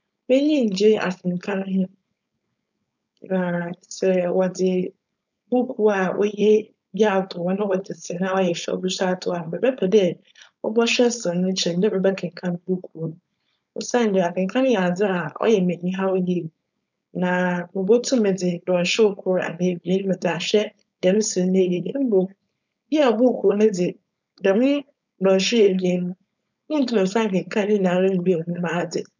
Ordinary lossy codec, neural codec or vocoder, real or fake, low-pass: none; codec, 16 kHz, 4.8 kbps, FACodec; fake; 7.2 kHz